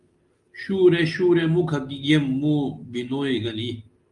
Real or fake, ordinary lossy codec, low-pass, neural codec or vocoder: real; Opus, 32 kbps; 10.8 kHz; none